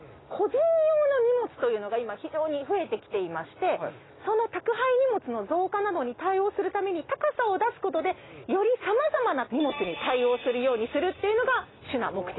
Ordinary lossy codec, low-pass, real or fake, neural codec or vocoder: AAC, 16 kbps; 7.2 kHz; real; none